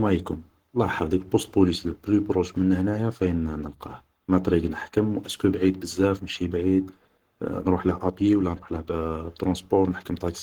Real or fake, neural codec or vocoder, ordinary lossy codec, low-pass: fake; codec, 44.1 kHz, 7.8 kbps, Pupu-Codec; Opus, 16 kbps; 19.8 kHz